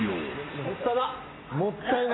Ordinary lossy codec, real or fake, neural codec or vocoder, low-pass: AAC, 16 kbps; real; none; 7.2 kHz